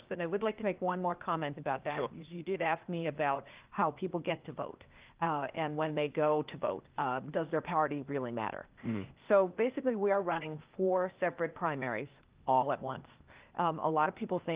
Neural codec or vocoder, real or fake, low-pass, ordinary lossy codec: codec, 16 kHz, 0.8 kbps, ZipCodec; fake; 3.6 kHz; Opus, 32 kbps